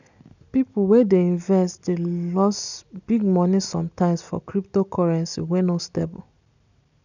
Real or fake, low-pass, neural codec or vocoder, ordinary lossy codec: real; 7.2 kHz; none; none